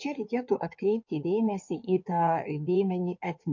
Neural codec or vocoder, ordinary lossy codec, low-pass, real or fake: codec, 16 kHz in and 24 kHz out, 2.2 kbps, FireRedTTS-2 codec; MP3, 48 kbps; 7.2 kHz; fake